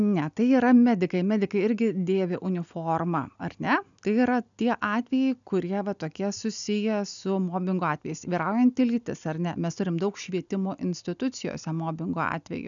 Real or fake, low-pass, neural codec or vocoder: real; 7.2 kHz; none